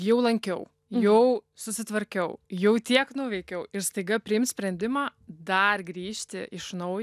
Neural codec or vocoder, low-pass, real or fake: none; 14.4 kHz; real